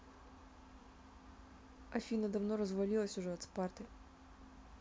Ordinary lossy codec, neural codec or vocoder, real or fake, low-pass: none; none; real; none